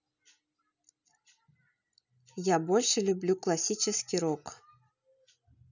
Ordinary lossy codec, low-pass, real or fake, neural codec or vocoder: none; 7.2 kHz; real; none